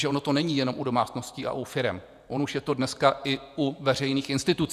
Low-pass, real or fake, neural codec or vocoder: 14.4 kHz; fake; vocoder, 48 kHz, 128 mel bands, Vocos